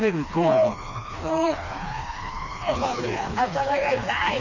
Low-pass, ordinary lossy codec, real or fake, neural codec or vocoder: 7.2 kHz; none; fake; codec, 16 kHz, 2 kbps, FreqCodec, smaller model